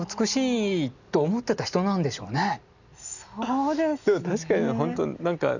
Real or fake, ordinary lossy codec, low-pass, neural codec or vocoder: real; none; 7.2 kHz; none